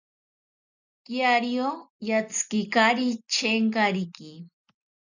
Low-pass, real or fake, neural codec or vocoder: 7.2 kHz; real; none